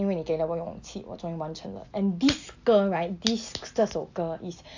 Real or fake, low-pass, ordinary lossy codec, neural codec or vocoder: real; 7.2 kHz; AAC, 48 kbps; none